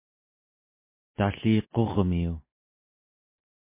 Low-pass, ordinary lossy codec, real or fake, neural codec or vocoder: 3.6 kHz; MP3, 24 kbps; real; none